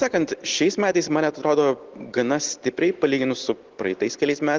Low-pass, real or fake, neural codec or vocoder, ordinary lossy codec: 7.2 kHz; real; none; Opus, 16 kbps